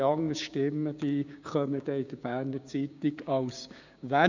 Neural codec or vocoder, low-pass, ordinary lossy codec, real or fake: codec, 16 kHz, 6 kbps, DAC; 7.2 kHz; none; fake